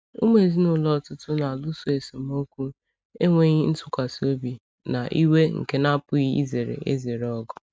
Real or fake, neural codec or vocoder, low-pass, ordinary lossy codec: real; none; none; none